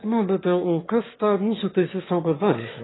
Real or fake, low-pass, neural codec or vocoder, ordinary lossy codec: fake; 7.2 kHz; autoencoder, 22.05 kHz, a latent of 192 numbers a frame, VITS, trained on one speaker; AAC, 16 kbps